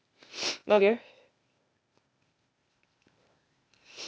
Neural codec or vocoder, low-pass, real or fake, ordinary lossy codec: codec, 16 kHz, 0.8 kbps, ZipCodec; none; fake; none